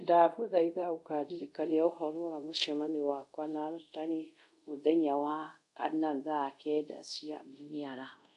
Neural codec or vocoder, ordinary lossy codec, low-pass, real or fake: codec, 24 kHz, 0.5 kbps, DualCodec; none; 10.8 kHz; fake